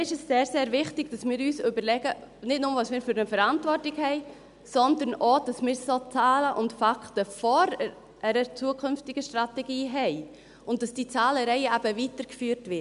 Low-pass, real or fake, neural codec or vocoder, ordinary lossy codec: 10.8 kHz; real; none; none